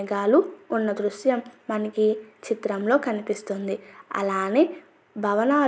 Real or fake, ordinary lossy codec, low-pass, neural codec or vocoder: real; none; none; none